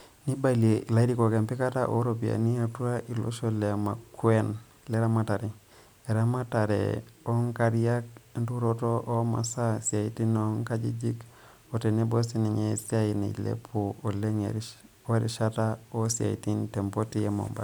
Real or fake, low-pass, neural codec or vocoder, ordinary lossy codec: real; none; none; none